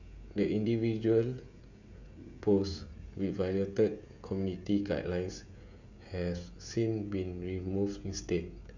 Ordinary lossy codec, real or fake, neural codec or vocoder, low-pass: none; fake; codec, 16 kHz, 16 kbps, FreqCodec, smaller model; 7.2 kHz